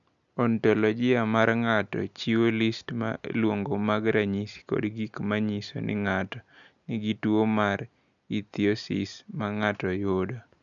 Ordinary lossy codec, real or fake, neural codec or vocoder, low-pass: none; real; none; 7.2 kHz